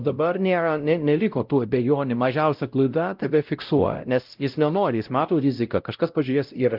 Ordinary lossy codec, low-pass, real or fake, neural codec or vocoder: Opus, 32 kbps; 5.4 kHz; fake; codec, 16 kHz, 0.5 kbps, X-Codec, WavLM features, trained on Multilingual LibriSpeech